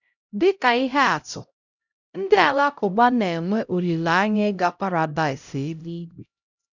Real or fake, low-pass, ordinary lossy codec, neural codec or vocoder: fake; 7.2 kHz; none; codec, 16 kHz, 0.5 kbps, X-Codec, HuBERT features, trained on LibriSpeech